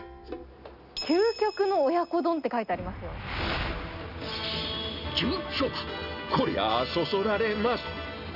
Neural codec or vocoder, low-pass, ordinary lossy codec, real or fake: none; 5.4 kHz; none; real